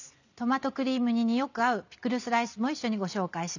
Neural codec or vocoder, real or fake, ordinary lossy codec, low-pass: none; real; none; 7.2 kHz